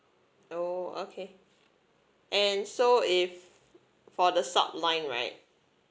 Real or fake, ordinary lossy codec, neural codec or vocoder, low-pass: real; none; none; none